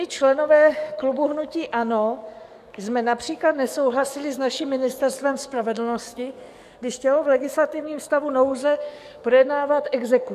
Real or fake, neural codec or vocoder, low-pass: fake; codec, 44.1 kHz, 7.8 kbps, DAC; 14.4 kHz